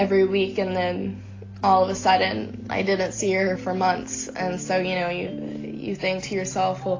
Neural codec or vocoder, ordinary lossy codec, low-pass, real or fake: none; AAC, 32 kbps; 7.2 kHz; real